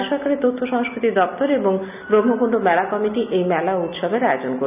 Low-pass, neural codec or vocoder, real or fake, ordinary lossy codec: 3.6 kHz; none; real; none